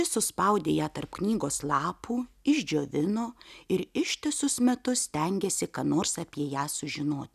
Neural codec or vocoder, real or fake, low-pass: none; real; 14.4 kHz